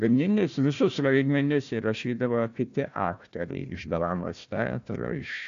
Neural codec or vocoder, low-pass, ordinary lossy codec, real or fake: codec, 16 kHz, 1 kbps, FunCodec, trained on Chinese and English, 50 frames a second; 7.2 kHz; AAC, 64 kbps; fake